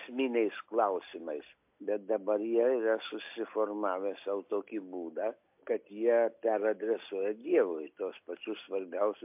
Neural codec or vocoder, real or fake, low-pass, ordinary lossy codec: none; real; 3.6 kHz; MP3, 32 kbps